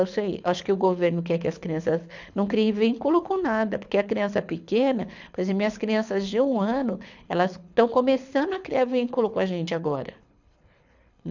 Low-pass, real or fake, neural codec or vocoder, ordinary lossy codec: 7.2 kHz; fake; codec, 24 kHz, 6 kbps, HILCodec; none